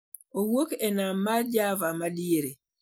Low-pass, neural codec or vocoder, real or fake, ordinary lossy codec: none; none; real; none